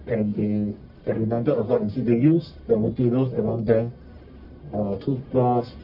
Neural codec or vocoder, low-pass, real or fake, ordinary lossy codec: codec, 44.1 kHz, 1.7 kbps, Pupu-Codec; 5.4 kHz; fake; none